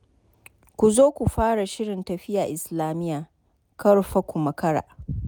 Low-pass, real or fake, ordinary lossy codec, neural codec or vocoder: none; real; none; none